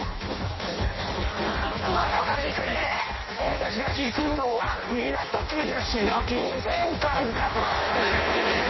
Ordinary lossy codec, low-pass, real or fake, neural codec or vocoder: MP3, 24 kbps; 7.2 kHz; fake; codec, 16 kHz in and 24 kHz out, 0.6 kbps, FireRedTTS-2 codec